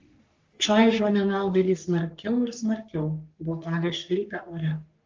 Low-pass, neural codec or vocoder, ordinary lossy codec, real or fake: 7.2 kHz; codec, 44.1 kHz, 3.4 kbps, Pupu-Codec; Opus, 32 kbps; fake